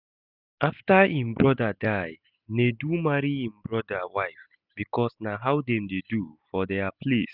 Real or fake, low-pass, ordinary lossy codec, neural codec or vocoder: real; 5.4 kHz; none; none